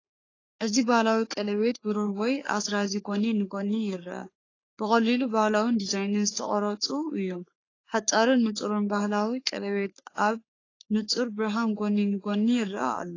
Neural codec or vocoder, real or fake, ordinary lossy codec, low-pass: codec, 44.1 kHz, 3.4 kbps, Pupu-Codec; fake; AAC, 32 kbps; 7.2 kHz